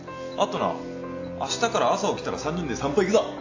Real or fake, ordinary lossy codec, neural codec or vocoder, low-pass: real; AAC, 48 kbps; none; 7.2 kHz